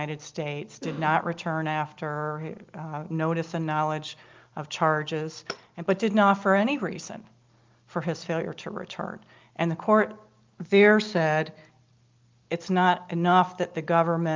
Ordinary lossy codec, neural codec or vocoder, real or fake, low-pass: Opus, 24 kbps; none; real; 7.2 kHz